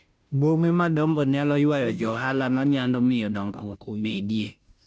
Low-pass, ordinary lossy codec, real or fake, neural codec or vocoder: none; none; fake; codec, 16 kHz, 0.5 kbps, FunCodec, trained on Chinese and English, 25 frames a second